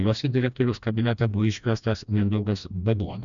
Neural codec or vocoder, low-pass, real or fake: codec, 16 kHz, 1 kbps, FreqCodec, smaller model; 7.2 kHz; fake